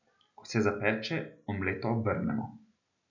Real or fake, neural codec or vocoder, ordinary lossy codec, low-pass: real; none; none; 7.2 kHz